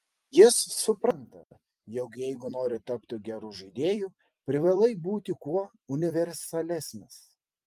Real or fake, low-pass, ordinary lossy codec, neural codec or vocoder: fake; 14.4 kHz; Opus, 32 kbps; vocoder, 44.1 kHz, 128 mel bands every 256 samples, BigVGAN v2